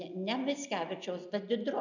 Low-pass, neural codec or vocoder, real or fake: 7.2 kHz; none; real